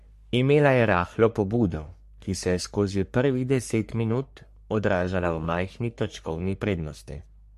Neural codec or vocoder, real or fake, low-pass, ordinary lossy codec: codec, 44.1 kHz, 3.4 kbps, Pupu-Codec; fake; 14.4 kHz; MP3, 64 kbps